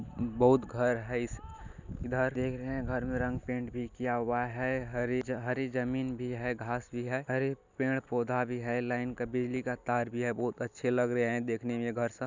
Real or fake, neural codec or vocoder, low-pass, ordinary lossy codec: real; none; 7.2 kHz; none